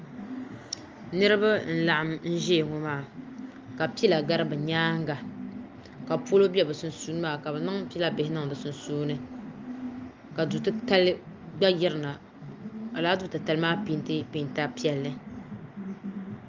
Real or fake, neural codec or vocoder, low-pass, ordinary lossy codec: real; none; 7.2 kHz; Opus, 32 kbps